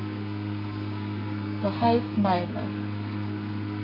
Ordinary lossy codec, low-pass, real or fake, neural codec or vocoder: none; 5.4 kHz; fake; codec, 32 kHz, 1.9 kbps, SNAC